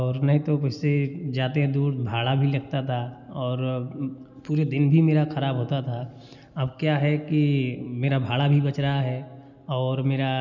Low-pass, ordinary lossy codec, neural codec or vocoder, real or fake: 7.2 kHz; none; none; real